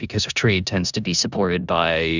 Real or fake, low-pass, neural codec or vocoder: fake; 7.2 kHz; codec, 16 kHz in and 24 kHz out, 0.9 kbps, LongCat-Audio-Codec, four codebook decoder